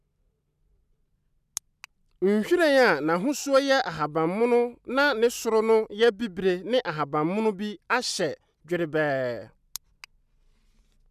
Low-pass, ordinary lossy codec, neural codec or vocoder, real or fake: 14.4 kHz; none; none; real